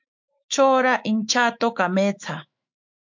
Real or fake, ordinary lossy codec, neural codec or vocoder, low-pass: fake; MP3, 64 kbps; autoencoder, 48 kHz, 128 numbers a frame, DAC-VAE, trained on Japanese speech; 7.2 kHz